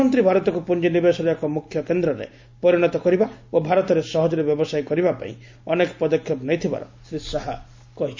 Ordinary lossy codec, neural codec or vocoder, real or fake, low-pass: MP3, 48 kbps; none; real; 7.2 kHz